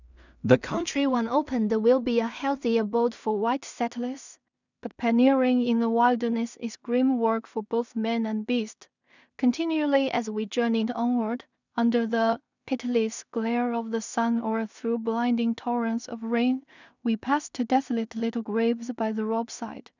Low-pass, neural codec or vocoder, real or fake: 7.2 kHz; codec, 16 kHz in and 24 kHz out, 0.4 kbps, LongCat-Audio-Codec, two codebook decoder; fake